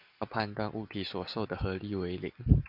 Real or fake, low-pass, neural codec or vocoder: real; 5.4 kHz; none